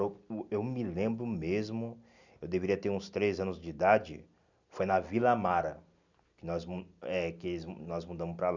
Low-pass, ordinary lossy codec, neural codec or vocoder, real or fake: 7.2 kHz; none; none; real